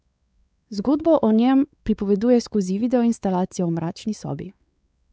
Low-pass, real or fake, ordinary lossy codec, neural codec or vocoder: none; fake; none; codec, 16 kHz, 4 kbps, X-Codec, WavLM features, trained on Multilingual LibriSpeech